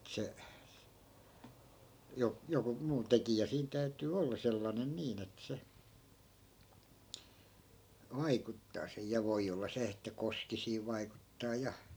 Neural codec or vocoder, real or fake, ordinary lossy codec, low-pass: none; real; none; none